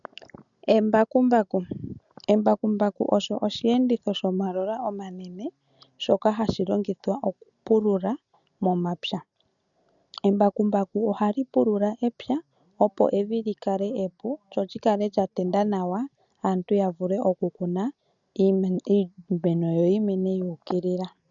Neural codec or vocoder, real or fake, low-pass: none; real; 7.2 kHz